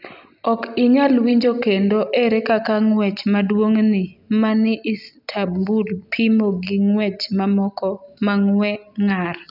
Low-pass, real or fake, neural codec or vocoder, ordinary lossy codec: 5.4 kHz; real; none; none